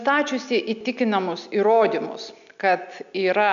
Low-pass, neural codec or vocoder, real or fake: 7.2 kHz; none; real